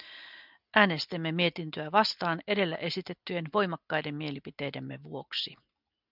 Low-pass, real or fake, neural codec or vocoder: 5.4 kHz; real; none